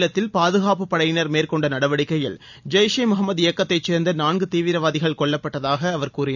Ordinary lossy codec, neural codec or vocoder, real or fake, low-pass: none; none; real; 7.2 kHz